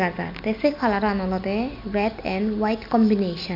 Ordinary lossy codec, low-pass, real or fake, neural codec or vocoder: MP3, 48 kbps; 5.4 kHz; real; none